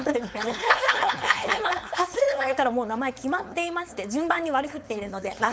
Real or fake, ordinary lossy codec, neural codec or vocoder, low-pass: fake; none; codec, 16 kHz, 4.8 kbps, FACodec; none